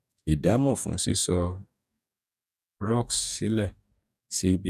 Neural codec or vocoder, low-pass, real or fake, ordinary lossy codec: codec, 44.1 kHz, 2.6 kbps, DAC; 14.4 kHz; fake; none